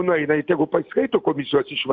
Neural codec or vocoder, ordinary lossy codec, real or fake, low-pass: none; Opus, 64 kbps; real; 7.2 kHz